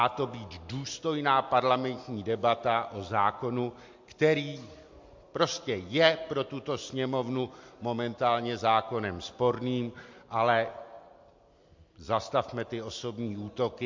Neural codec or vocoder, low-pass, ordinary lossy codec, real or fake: none; 7.2 kHz; MP3, 48 kbps; real